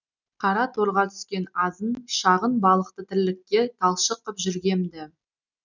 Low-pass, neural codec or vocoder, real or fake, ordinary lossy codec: 7.2 kHz; none; real; none